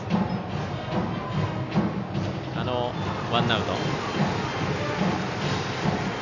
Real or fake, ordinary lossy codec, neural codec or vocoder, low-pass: real; none; none; 7.2 kHz